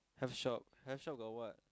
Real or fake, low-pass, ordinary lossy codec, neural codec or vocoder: real; none; none; none